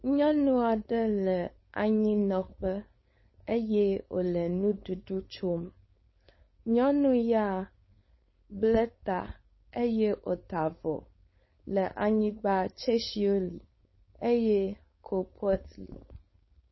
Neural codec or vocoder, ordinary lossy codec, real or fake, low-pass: codec, 16 kHz, 4.8 kbps, FACodec; MP3, 24 kbps; fake; 7.2 kHz